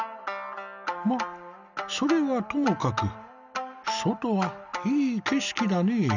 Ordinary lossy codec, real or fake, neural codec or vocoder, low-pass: none; real; none; 7.2 kHz